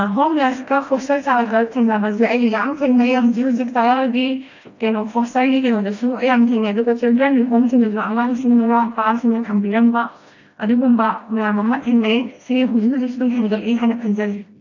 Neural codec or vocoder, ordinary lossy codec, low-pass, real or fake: codec, 16 kHz, 1 kbps, FreqCodec, smaller model; AAC, 48 kbps; 7.2 kHz; fake